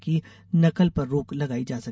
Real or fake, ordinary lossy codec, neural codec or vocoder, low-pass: real; none; none; none